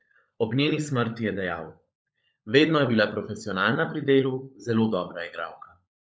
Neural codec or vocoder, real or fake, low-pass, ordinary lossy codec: codec, 16 kHz, 16 kbps, FunCodec, trained on LibriTTS, 50 frames a second; fake; none; none